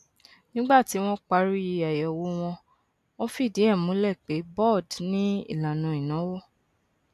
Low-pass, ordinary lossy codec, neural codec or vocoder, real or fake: 14.4 kHz; none; none; real